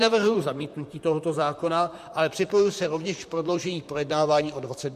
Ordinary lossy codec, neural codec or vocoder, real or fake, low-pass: MP3, 64 kbps; vocoder, 44.1 kHz, 128 mel bands, Pupu-Vocoder; fake; 14.4 kHz